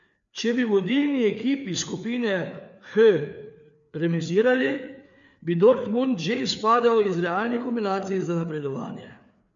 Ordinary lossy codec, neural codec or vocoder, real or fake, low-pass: none; codec, 16 kHz, 4 kbps, FreqCodec, larger model; fake; 7.2 kHz